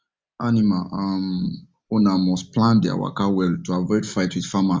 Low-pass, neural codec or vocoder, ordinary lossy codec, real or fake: none; none; none; real